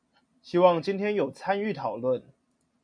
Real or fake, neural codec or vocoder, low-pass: real; none; 9.9 kHz